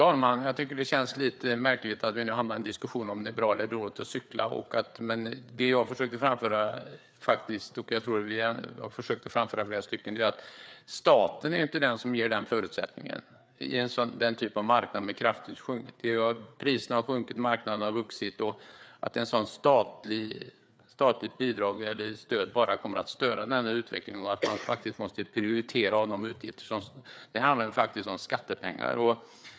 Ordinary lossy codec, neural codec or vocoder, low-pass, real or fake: none; codec, 16 kHz, 4 kbps, FreqCodec, larger model; none; fake